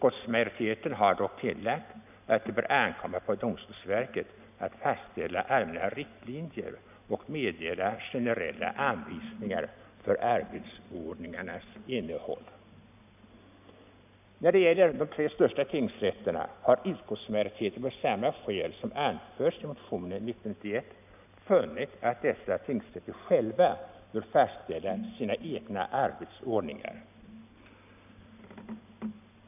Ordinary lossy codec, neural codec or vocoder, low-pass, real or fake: none; none; 3.6 kHz; real